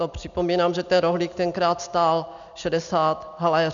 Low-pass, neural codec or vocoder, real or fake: 7.2 kHz; none; real